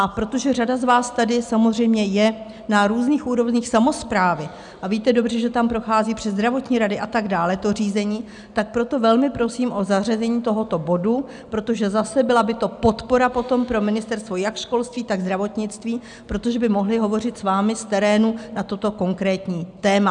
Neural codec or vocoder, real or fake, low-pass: none; real; 9.9 kHz